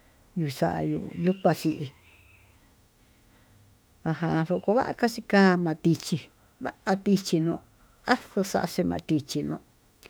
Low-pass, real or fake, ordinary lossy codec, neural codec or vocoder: none; fake; none; autoencoder, 48 kHz, 32 numbers a frame, DAC-VAE, trained on Japanese speech